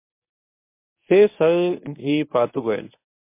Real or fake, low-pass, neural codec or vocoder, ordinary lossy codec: fake; 3.6 kHz; codec, 24 kHz, 0.9 kbps, WavTokenizer, medium speech release version 1; MP3, 24 kbps